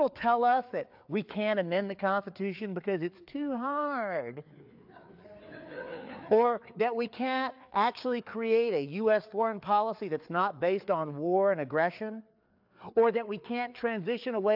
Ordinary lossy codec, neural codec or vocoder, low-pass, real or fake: AAC, 48 kbps; codec, 16 kHz, 4 kbps, FreqCodec, larger model; 5.4 kHz; fake